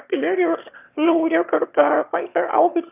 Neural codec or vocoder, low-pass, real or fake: autoencoder, 22.05 kHz, a latent of 192 numbers a frame, VITS, trained on one speaker; 3.6 kHz; fake